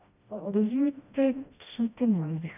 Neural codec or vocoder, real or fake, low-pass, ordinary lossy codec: codec, 16 kHz, 1 kbps, FreqCodec, smaller model; fake; 3.6 kHz; none